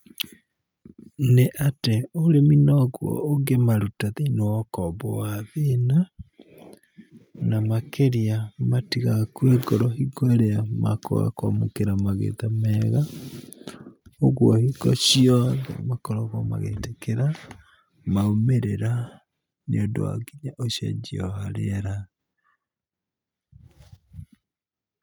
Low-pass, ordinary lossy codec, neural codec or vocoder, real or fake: none; none; none; real